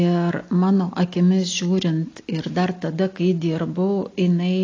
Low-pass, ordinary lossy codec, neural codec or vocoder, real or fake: 7.2 kHz; AAC, 32 kbps; none; real